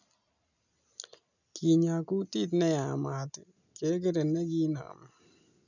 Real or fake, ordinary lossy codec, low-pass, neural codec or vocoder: real; none; 7.2 kHz; none